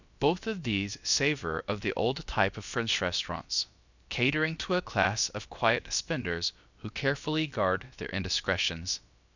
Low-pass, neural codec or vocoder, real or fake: 7.2 kHz; codec, 16 kHz, about 1 kbps, DyCAST, with the encoder's durations; fake